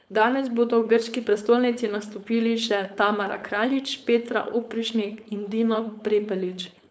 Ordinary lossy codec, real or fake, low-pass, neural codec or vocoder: none; fake; none; codec, 16 kHz, 4.8 kbps, FACodec